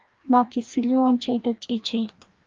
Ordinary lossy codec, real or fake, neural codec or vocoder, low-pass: Opus, 32 kbps; fake; codec, 16 kHz, 1 kbps, FreqCodec, larger model; 7.2 kHz